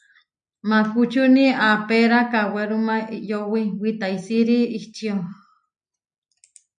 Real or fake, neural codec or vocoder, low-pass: real; none; 9.9 kHz